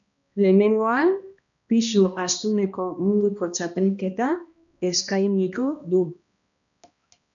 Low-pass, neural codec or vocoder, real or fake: 7.2 kHz; codec, 16 kHz, 1 kbps, X-Codec, HuBERT features, trained on balanced general audio; fake